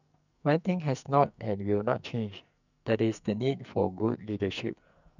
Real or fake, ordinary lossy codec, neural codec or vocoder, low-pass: fake; none; codec, 44.1 kHz, 2.6 kbps, SNAC; 7.2 kHz